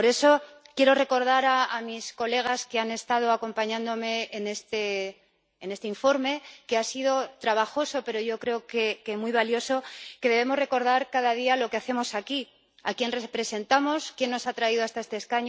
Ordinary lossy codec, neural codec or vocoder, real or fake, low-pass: none; none; real; none